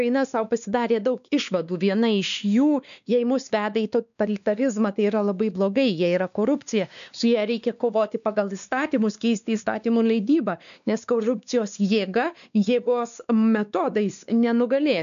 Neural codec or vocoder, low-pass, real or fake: codec, 16 kHz, 2 kbps, X-Codec, WavLM features, trained on Multilingual LibriSpeech; 7.2 kHz; fake